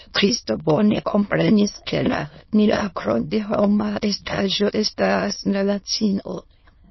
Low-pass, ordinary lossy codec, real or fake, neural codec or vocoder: 7.2 kHz; MP3, 24 kbps; fake; autoencoder, 22.05 kHz, a latent of 192 numbers a frame, VITS, trained on many speakers